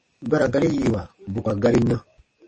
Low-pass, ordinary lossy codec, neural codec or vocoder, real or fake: 10.8 kHz; MP3, 32 kbps; codec, 44.1 kHz, 7.8 kbps, DAC; fake